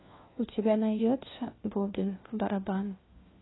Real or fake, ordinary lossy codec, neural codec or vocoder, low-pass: fake; AAC, 16 kbps; codec, 16 kHz, 1 kbps, FunCodec, trained on LibriTTS, 50 frames a second; 7.2 kHz